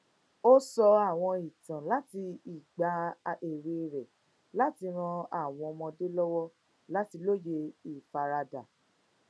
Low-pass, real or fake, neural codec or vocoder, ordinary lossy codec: none; real; none; none